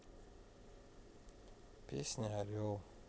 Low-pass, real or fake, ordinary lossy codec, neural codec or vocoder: none; real; none; none